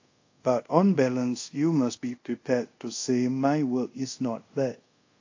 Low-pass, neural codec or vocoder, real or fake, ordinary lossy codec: 7.2 kHz; codec, 24 kHz, 0.5 kbps, DualCodec; fake; AAC, 48 kbps